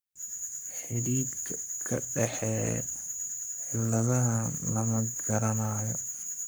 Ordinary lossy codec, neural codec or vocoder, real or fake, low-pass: none; codec, 44.1 kHz, 7.8 kbps, Pupu-Codec; fake; none